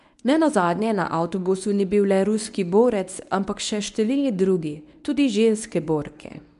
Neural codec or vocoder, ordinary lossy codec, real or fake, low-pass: codec, 24 kHz, 0.9 kbps, WavTokenizer, medium speech release version 1; none; fake; 10.8 kHz